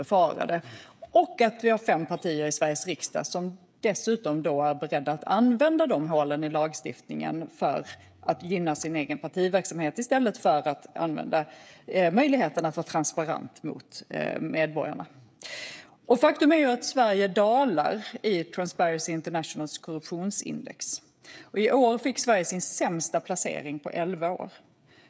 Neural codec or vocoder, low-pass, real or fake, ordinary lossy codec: codec, 16 kHz, 16 kbps, FreqCodec, smaller model; none; fake; none